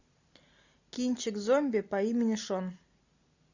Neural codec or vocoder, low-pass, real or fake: none; 7.2 kHz; real